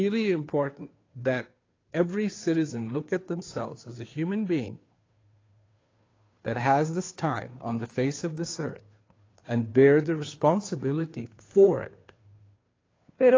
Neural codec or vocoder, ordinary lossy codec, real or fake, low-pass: codec, 16 kHz, 2 kbps, FunCodec, trained on Chinese and English, 25 frames a second; AAC, 32 kbps; fake; 7.2 kHz